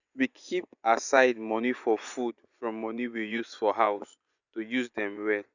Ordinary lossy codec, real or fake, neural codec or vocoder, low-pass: none; fake; vocoder, 24 kHz, 100 mel bands, Vocos; 7.2 kHz